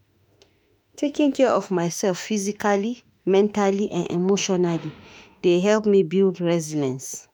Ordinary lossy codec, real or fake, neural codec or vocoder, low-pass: none; fake; autoencoder, 48 kHz, 32 numbers a frame, DAC-VAE, trained on Japanese speech; none